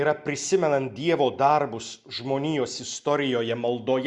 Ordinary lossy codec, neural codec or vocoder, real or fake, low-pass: Opus, 64 kbps; none; real; 10.8 kHz